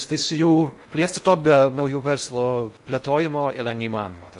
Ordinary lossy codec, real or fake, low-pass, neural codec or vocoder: AAC, 64 kbps; fake; 10.8 kHz; codec, 16 kHz in and 24 kHz out, 0.6 kbps, FocalCodec, streaming, 2048 codes